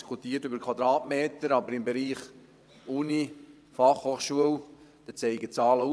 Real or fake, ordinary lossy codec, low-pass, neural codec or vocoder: fake; none; none; vocoder, 22.05 kHz, 80 mel bands, WaveNeXt